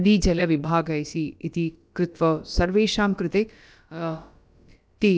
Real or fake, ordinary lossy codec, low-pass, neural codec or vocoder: fake; none; none; codec, 16 kHz, about 1 kbps, DyCAST, with the encoder's durations